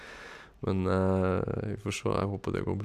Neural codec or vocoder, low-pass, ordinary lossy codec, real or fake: none; 14.4 kHz; none; real